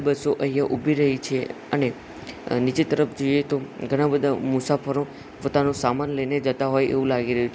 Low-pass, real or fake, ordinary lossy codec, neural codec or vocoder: none; real; none; none